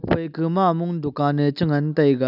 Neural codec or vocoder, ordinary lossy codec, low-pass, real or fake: none; none; 5.4 kHz; real